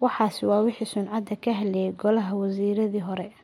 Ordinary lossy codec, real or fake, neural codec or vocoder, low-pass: MP3, 64 kbps; real; none; 19.8 kHz